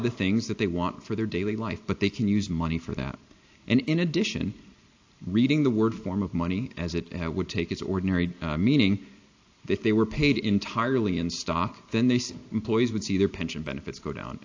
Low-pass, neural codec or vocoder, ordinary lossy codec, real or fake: 7.2 kHz; none; AAC, 48 kbps; real